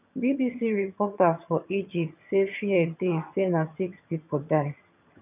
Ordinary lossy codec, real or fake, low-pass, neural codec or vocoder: none; fake; 3.6 kHz; vocoder, 22.05 kHz, 80 mel bands, HiFi-GAN